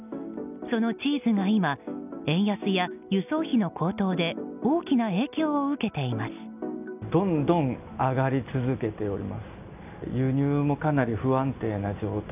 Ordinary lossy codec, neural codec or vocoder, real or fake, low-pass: none; none; real; 3.6 kHz